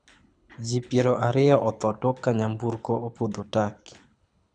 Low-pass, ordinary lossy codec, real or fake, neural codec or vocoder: 9.9 kHz; none; fake; codec, 24 kHz, 6 kbps, HILCodec